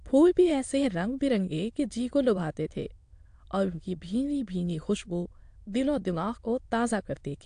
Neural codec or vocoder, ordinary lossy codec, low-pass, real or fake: autoencoder, 22.05 kHz, a latent of 192 numbers a frame, VITS, trained on many speakers; AAC, 64 kbps; 9.9 kHz; fake